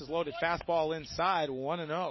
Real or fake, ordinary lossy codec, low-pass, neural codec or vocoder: real; MP3, 24 kbps; 7.2 kHz; none